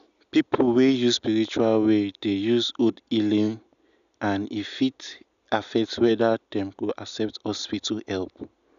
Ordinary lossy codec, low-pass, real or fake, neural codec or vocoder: none; 7.2 kHz; real; none